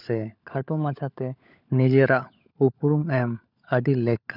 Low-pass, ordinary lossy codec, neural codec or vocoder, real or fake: 5.4 kHz; none; codec, 16 kHz, 16 kbps, FunCodec, trained on LibriTTS, 50 frames a second; fake